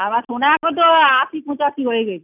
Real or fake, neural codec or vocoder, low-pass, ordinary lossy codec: real; none; 3.6 kHz; none